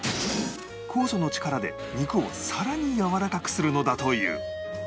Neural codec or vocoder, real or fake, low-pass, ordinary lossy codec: none; real; none; none